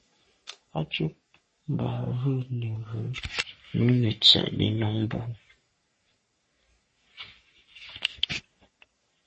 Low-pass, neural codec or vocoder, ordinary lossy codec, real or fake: 10.8 kHz; codec, 44.1 kHz, 3.4 kbps, Pupu-Codec; MP3, 32 kbps; fake